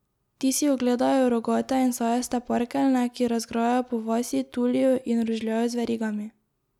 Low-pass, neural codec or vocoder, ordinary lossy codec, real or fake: 19.8 kHz; none; none; real